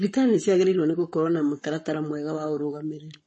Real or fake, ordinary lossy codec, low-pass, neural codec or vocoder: fake; MP3, 32 kbps; 10.8 kHz; codec, 44.1 kHz, 7.8 kbps, Pupu-Codec